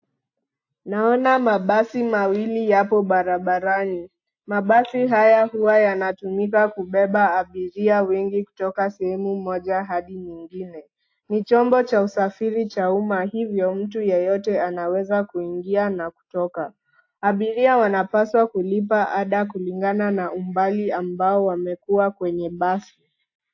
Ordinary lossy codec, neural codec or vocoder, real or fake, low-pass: AAC, 48 kbps; none; real; 7.2 kHz